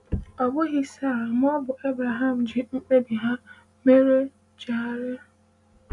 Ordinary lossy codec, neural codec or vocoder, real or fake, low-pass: none; none; real; 10.8 kHz